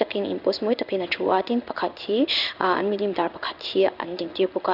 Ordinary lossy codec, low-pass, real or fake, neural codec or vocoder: none; 5.4 kHz; fake; codec, 16 kHz in and 24 kHz out, 1 kbps, XY-Tokenizer